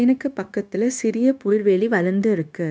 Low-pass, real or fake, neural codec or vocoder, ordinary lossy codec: none; fake; codec, 16 kHz, 0.9 kbps, LongCat-Audio-Codec; none